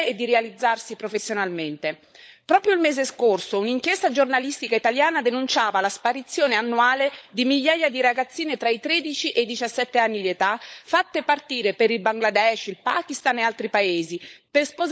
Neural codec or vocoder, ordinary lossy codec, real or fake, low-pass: codec, 16 kHz, 16 kbps, FunCodec, trained on LibriTTS, 50 frames a second; none; fake; none